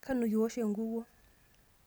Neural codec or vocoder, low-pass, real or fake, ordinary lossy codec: none; none; real; none